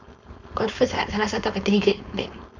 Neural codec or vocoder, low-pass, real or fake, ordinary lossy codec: codec, 16 kHz, 4.8 kbps, FACodec; 7.2 kHz; fake; none